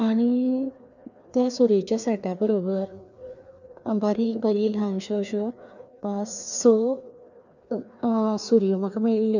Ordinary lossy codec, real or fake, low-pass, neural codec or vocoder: none; fake; 7.2 kHz; codec, 16 kHz, 2 kbps, FreqCodec, larger model